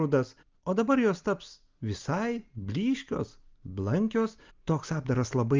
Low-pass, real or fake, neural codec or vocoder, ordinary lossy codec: 7.2 kHz; real; none; Opus, 24 kbps